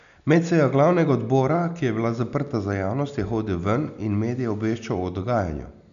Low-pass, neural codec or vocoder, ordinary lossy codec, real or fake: 7.2 kHz; none; none; real